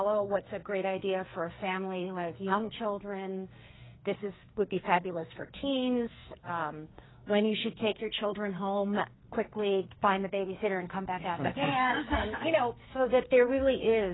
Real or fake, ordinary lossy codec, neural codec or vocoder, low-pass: fake; AAC, 16 kbps; codec, 44.1 kHz, 2.6 kbps, SNAC; 7.2 kHz